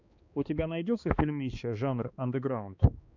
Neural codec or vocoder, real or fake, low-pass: codec, 16 kHz, 2 kbps, X-Codec, HuBERT features, trained on balanced general audio; fake; 7.2 kHz